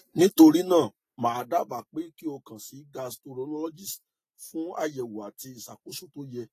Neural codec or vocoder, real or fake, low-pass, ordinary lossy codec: none; real; 14.4 kHz; AAC, 48 kbps